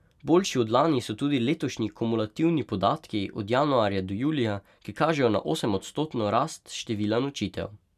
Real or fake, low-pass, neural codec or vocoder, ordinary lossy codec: real; 14.4 kHz; none; none